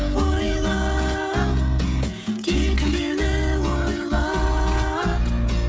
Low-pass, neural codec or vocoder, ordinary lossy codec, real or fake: none; codec, 16 kHz, 16 kbps, FreqCodec, smaller model; none; fake